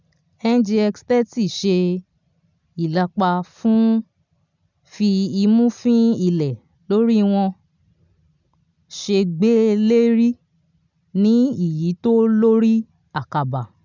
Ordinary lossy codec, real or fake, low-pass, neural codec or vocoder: none; real; 7.2 kHz; none